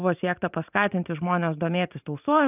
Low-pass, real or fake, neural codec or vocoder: 3.6 kHz; fake; vocoder, 44.1 kHz, 128 mel bands every 512 samples, BigVGAN v2